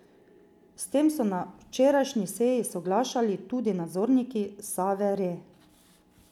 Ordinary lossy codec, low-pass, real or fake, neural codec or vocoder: none; 19.8 kHz; real; none